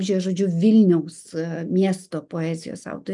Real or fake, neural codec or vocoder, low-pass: real; none; 10.8 kHz